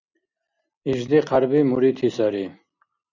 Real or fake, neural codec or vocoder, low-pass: real; none; 7.2 kHz